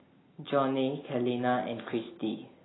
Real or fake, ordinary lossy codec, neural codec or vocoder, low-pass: real; AAC, 16 kbps; none; 7.2 kHz